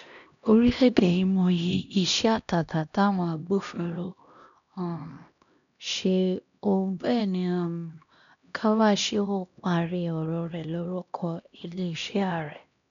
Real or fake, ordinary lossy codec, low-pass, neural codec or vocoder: fake; none; 7.2 kHz; codec, 16 kHz, 1 kbps, X-Codec, HuBERT features, trained on LibriSpeech